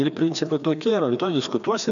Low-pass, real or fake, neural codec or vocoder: 7.2 kHz; fake; codec, 16 kHz, 2 kbps, FreqCodec, larger model